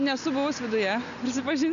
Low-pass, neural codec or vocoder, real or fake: 7.2 kHz; none; real